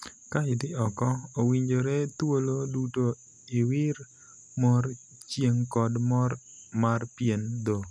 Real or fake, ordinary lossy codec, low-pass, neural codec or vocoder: real; none; none; none